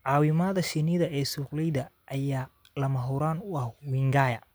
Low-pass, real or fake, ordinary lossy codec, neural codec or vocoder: none; real; none; none